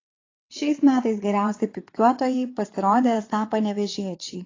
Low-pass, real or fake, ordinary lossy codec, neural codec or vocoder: 7.2 kHz; fake; AAC, 32 kbps; vocoder, 22.05 kHz, 80 mel bands, WaveNeXt